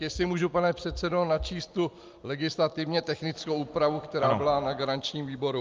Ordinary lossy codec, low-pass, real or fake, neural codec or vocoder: Opus, 32 kbps; 7.2 kHz; real; none